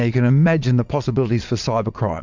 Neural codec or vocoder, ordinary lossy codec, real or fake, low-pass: vocoder, 22.05 kHz, 80 mel bands, Vocos; MP3, 64 kbps; fake; 7.2 kHz